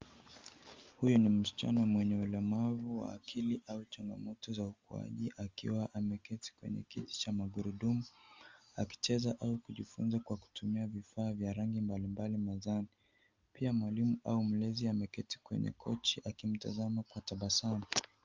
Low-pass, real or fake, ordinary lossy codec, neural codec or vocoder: 7.2 kHz; real; Opus, 32 kbps; none